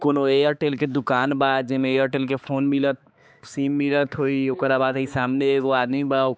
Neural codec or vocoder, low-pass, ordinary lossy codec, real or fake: codec, 16 kHz, 4 kbps, X-Codec, HuBERT features, trained on balanced general audio; none; none; fake